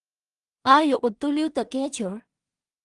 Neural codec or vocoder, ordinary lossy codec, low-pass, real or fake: codec, 16 kHz in and 24 kHz out, 0.4 kbps, LongCat-Audio-Codec, two codebook decoder; Opus, 32 kbps; 10.8 kHz; fake